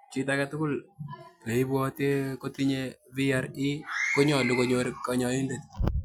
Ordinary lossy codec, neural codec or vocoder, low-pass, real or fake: none; none; 19.8 kHz; real